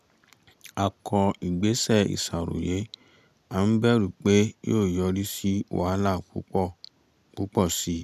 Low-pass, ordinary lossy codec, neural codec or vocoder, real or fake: 14.4 kHz; none; none; real